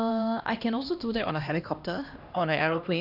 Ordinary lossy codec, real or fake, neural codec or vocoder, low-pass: none; fake; codec, 16 kHz, 1 kbps, X-Codec, HuBERT features, trained on LibriSpeech; 5.4 kHz